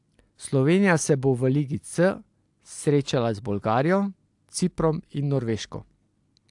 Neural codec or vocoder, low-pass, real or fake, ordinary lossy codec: none; 10.8 kHz; real; AAC, 64 kbps